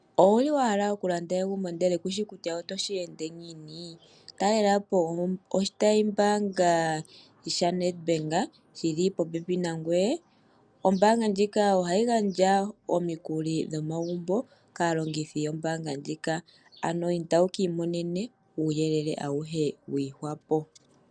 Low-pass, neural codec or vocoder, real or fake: 9.9 kHz; none; real